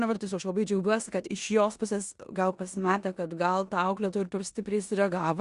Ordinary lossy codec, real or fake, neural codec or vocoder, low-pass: Opus, 64 kbps; fake; codec, 16 kHz in and 24 kHz out, 0.9 kbps, LongCat-Audio-Codec, four codebook decoder; 10.8 kHz